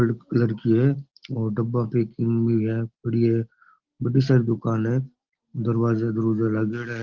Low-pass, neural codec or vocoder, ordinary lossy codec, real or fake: 7.2 kHz; none; Opus, 16 kbps; real